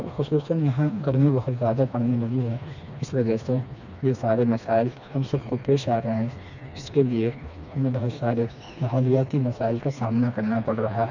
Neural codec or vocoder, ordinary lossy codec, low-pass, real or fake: codec, 16 kHz, 2 kbps, FreqCodec, smaller model; none; 7.2 kHz; fake